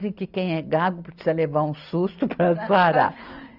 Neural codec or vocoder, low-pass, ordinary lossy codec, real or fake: none; 5.4 kHz; none; real